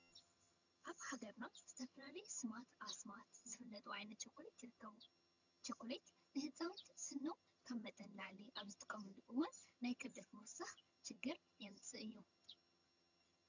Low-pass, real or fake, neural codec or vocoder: 7.2 kHz; fake; vocoder, 22.05 kHz, 80 mel bands, HiFi-GAN